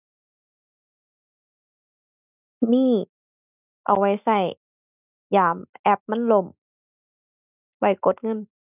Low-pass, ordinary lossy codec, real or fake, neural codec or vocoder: 3.6 kHz; none; real; none